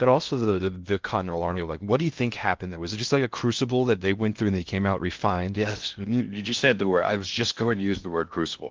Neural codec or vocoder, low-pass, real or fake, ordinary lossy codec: codec, 16 kHz in and 24 kHz out, 0.6 kbps, FocalCodec, streaming, 2048 codes; 7.2 kHz; fake; Opus, 32 kbps